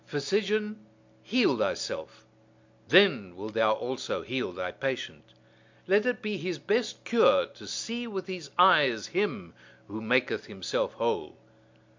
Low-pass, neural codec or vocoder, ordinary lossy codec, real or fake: 7.2 kHz; none; MP3, 64 kbps; real